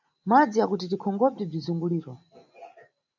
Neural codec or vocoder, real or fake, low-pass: none; real; 7.2 kHz